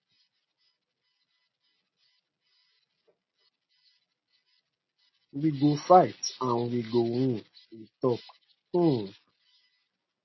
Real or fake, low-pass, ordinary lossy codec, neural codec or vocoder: real; 7.2 kHz; MP3, 24 kbps; none